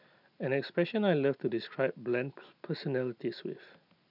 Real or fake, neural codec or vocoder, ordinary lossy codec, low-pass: real; none; none; 5.4 kHz